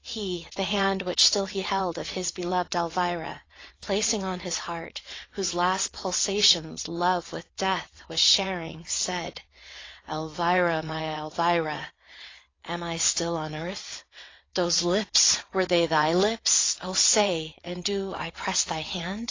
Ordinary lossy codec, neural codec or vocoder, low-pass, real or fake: AAC, 32 kbps; vocoder, 22.05 kHz, 80 mel bands, WaveNeXt; 7.2 kHz; fake